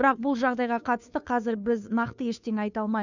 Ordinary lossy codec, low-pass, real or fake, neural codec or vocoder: none; 7.2 kHz; fake; codec, 16 kHz, 2 kbps, FunCodec, trained on Chinese and English, 25 frames a second